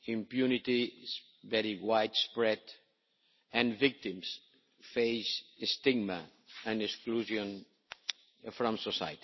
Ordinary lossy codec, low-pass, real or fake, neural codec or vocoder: MP3, 24 kbps; 7.2 kHz; real; none